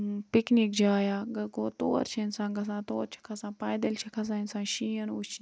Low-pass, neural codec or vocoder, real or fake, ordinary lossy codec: none; none; real; none